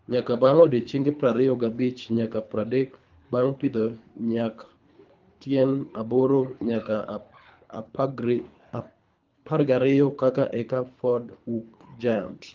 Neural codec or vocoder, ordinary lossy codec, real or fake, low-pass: codec, 24 kHz, 3 kbps, HILCodec; Opus, 32 kbps; fake; 7.2 kHz